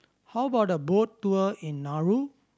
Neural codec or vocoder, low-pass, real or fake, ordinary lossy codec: none; none; real; none